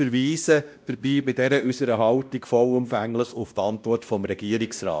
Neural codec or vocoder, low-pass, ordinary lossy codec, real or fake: codec, 16 kHz, 1 kbps, X-Codec, WavLM features, trained on Multilingual LibriSpeech; none; none; fake